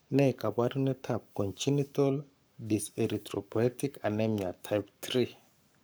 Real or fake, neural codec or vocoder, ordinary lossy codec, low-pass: fake; codec, 44.1 kHz, 7.8 kbps, Pupu-Codec; none; none